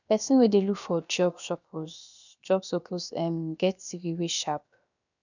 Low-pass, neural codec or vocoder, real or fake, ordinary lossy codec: 7.2 kHz; codec, 16 kHz, about 1 kbps, DyCAST, with the encoder's durations; fake; none